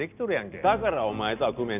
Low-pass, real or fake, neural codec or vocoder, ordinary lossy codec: 3.6 kHz; real; none; none